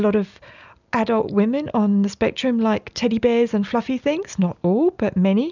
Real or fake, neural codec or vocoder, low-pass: real; none; 7.2 kHz